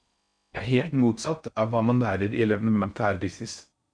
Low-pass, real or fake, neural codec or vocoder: 9.9 kHz; fake; codec, 16 kHz in and 24 kHz out, 0.6 kbps, FocalCodec, streaming, 4096 codes